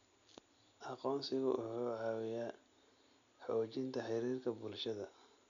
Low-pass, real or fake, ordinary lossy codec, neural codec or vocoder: 7.2 kHz; real; none; none